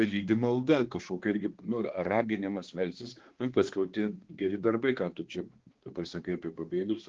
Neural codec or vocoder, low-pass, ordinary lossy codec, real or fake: codec, 16 kHz, 2 kbps, X-Codec, HuBERT features, trained on balanced general audio; 7.2 kHz; Opus, 16 kbps; fake